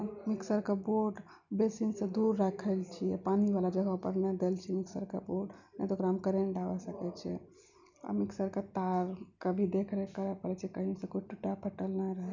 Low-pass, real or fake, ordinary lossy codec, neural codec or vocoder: 7.2 kHz; real; none; none